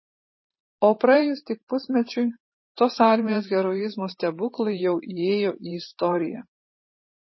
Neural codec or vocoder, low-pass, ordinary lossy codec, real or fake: vocoder, 44.1 kHz, 128 mel bands every 512 samples, BigVGAN v2; 7.2 kHz; MP3, 24 kbps; fake